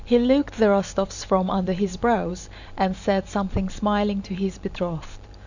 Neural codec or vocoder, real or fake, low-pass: vocoder, 44.1 kHz, 128 mel bands every 512 samples, BigVGAN v2; fake; 7.2 kHz